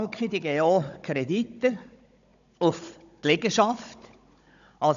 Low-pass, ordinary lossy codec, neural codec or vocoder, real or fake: 7.2 kHz; none; codec, 16 kHz, 16 kbps, FunCodec, trained on Chinese and English, 50 frames a second; fake